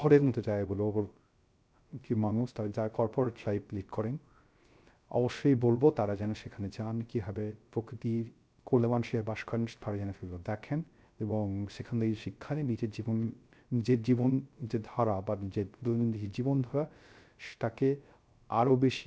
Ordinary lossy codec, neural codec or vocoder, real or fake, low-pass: none; codec, 16 kHz, 0.3 kbps, FocalCodec; fake; none